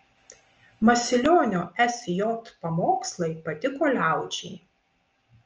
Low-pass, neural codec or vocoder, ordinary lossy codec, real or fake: 7.2 kHz; none; Opus, 24 kbps; real